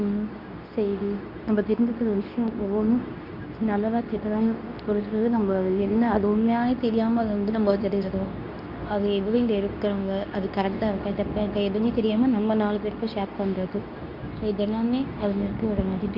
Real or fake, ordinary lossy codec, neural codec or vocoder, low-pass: fake; Opus, 64 kbps; codec, 24 kHz, 0.9 kbps, WavTokenizer, medium speech release version 2; 5.4 kHz